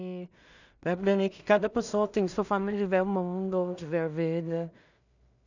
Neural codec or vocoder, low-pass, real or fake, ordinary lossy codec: codec, 16 kHz in and 24 kHz out, 0.4 kbps, LongCat-Audio-Codec, two codebook decoder; 7.2 kHz; fake; none